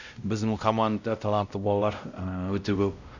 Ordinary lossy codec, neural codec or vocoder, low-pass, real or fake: none; codec, 16 kHz, 0.5 kbps, X-Codec, WavLM features, trained on Multilingual LibriSpeech; 7.2 kHz; fake